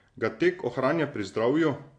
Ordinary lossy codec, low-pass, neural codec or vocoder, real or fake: AAC, 48 kbps; 9.9 kHz; none; real